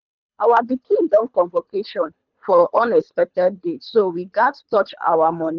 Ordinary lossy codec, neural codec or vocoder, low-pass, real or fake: none; codec, 24 kHz, 3 kbps, HILCodec; 7.2 kHz; fake